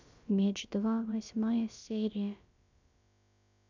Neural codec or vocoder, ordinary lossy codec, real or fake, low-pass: codec, 16 kHz, about 1 kbps, DyCAST, with the encoder's durations; none; fake; 7.2 kHz